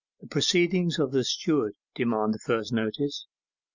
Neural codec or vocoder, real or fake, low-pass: none; real; 7.2 kHz